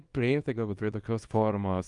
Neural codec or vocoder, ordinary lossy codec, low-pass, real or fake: codec, 24 kHz, 0.9 kbps, WavTokenizer, small release; Opus, 32 kbps; 10.8 kHz; fake